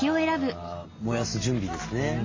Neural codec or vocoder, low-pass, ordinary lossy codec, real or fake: none; 7.2 kHz; MP3, 32 kbps; real